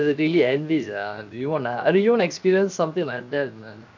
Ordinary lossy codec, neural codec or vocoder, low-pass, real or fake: none; codec, 16 kHz, 0.7 kbps, FocalCodec; 7.2 kHz; fake